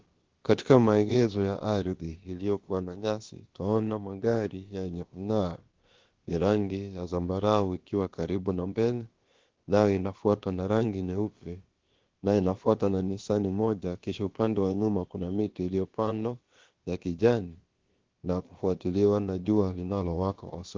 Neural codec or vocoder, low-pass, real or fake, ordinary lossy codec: codec, 16 kHz, about 1 kbps, DyCAST, with the encoder's durations; 7.2 kHz; fake; Opus, 16 kbps